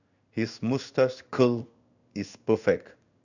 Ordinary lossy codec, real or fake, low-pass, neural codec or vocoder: none; fake; 7.2 kHz; codec, 16 kHz in and 24 kHz out, 1 kbps, XY-Tokenizer